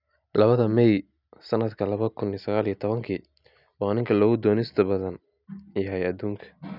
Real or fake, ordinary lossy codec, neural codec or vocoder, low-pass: real; none; none; 5.4 kHz